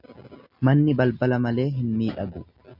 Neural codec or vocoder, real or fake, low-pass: none; real; 5.4 kHz